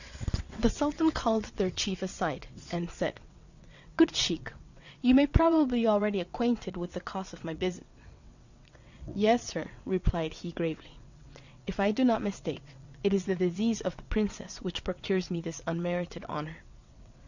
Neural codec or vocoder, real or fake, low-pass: vocoder, 22.05 kHz, 80 mel bands, WaveNeXt; fake; 7.2 kHz